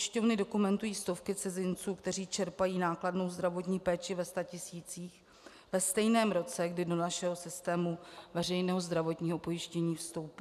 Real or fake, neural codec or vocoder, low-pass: real; none; 14.4 kHz